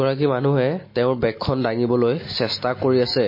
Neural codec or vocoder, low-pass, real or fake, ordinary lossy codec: none; 5.4 kHz; real; MP3, 24 kbps